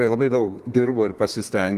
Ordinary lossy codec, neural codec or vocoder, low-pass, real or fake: Opus, 24 kbps; codec, 32 kHz, 1.9 kbps, SNAC; 14.4 kHz; fake